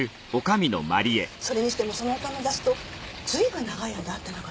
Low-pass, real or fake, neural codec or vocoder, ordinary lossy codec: none; real; none; none